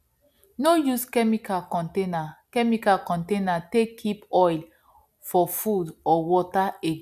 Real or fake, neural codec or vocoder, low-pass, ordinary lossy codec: real; none; 14.4 kHz; none